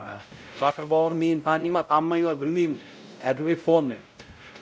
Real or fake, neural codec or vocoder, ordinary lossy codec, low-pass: fake; codec, 16 kHz, 0.5 kbps, X-Codec, WavLM features, trained on Multilingual LibriSpeech; none; none